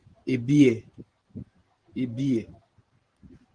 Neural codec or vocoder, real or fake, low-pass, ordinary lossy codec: vocoder, 44.1 kHz, 128 mel bands every 512 samples, BigVGAN v2; fake; 9.9 kHz; Opus, 16 kbps